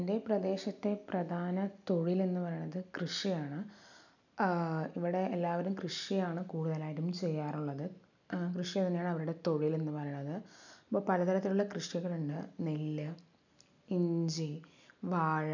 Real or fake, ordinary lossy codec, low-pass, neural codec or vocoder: real; none; 7.2 kHz; none